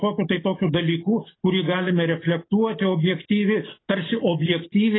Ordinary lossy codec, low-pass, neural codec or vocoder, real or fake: AAC, 16 kbps; 7.2 kHz; none; real